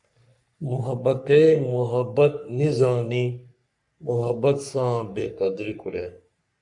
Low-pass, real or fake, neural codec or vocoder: 10.8 kHz; fake; codec, 44.1 kHz, 3.4 kbps, Pupu-Codec